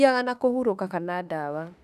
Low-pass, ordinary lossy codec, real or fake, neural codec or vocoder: 14.4 kHz; none; fake; autoencoder, 48 kHz, 32 numbers a frame, DAC-VAE, trained on Japanese speech